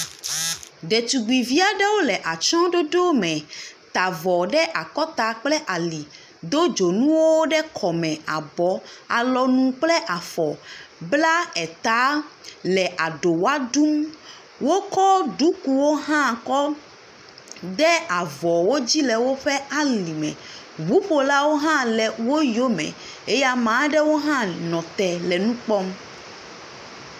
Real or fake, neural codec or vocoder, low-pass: real; none; 14.4 kHz